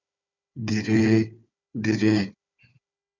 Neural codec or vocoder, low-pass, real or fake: codec, 16 kHz, 4 kbps, FunCodec, trained on Chinese and English, 50 frames a second; 7.2 kHz; fake